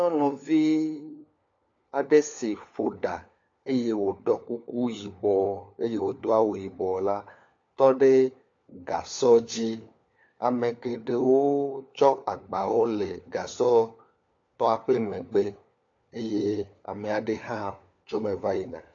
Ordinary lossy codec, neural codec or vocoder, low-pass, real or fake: AAC, 48 kbps; codec, 16 kHz, 4 kbps, FunCodec, trained on LibriTTS, 50 frames a second; 7.2 kHz; fake